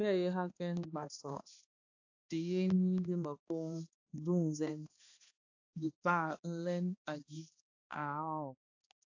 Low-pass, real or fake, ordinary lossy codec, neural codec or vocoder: 7.2 kHz; fake; AAC, 48 kbps; codec, 16 kHz, 2 kbps, X-Codec, HuBERT features, trained on balanced general audio